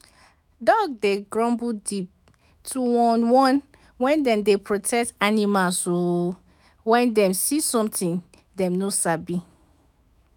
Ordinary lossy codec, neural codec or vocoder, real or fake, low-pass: none; autoencoder, 48 kHz, 128 numbers a frame, DAC-VAE, trained on Japanese speech; fake; none